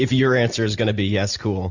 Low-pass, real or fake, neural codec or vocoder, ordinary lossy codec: 7.2 kHz; real; none; Opus, 64 kbps